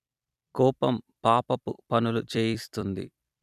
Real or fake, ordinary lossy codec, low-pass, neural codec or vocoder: fake; none; 14.4 kHz; vocoder, 44.1 kHz, 128 mel bands every 256 samples, BigVGAN v2